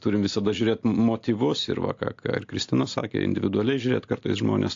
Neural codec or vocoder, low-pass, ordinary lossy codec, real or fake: none; 7.2 kHz; AAC, 48 kbps; real